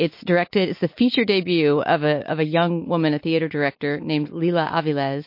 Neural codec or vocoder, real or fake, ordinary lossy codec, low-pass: none; real; MP3, 32 kbps; 5.4 kHz